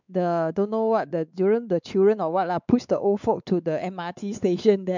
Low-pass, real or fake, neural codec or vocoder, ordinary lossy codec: 7.2 kHz; fake; codec, 16 kHz, 4 kbps, X-Codec, WavLM features, trained on Multilingual LibriSpeech; none